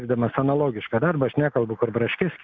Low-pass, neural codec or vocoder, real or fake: 7.2 kHz; none; real